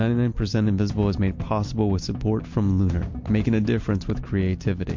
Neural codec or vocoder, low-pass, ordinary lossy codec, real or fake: none; 7.2 kHz; MP3, 48 kbps; real